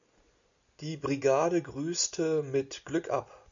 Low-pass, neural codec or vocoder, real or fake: 7.2 kHz; none; real